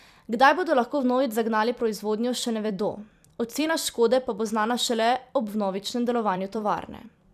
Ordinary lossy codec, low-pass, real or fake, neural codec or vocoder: none; 14.4 kHz; fake; vocoder, 44.1 kHz, 128 mel bands every 256 samples, BigVGAN v2